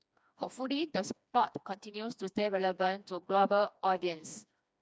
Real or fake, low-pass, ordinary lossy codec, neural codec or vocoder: fake; none; none; codec, 16 kHz, 2 kbps, FreqCodec, smaller model